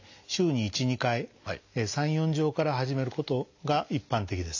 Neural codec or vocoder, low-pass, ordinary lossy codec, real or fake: none; 7.2 kHz; AAC, 48 kbps; real